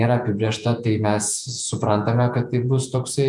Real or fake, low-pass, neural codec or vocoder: fake; 10.8 kHz; vocoder, 48 kHz, 128 mel bands, Vocos